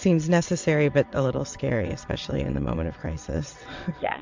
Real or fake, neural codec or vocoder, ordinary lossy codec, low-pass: fake; vocoder, 22.05 kHz, 80 mel bands, WaveNeXt; MP3, 64 kbps; 7.2 kHz